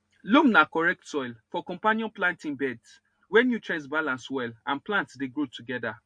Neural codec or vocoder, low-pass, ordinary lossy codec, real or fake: none; 9.9 kHz; MP3, 48 kbps; real